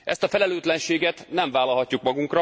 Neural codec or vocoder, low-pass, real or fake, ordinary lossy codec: none; none; real; none